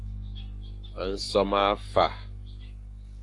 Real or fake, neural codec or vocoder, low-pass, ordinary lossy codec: fake; codec, 44.1 kHz, 7.8 kbps, Pupu-Codec; 10.8 kHz; AAC, 48 kbps